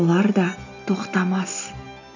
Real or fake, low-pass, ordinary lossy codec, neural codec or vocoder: real; 7.2 kHz; none; none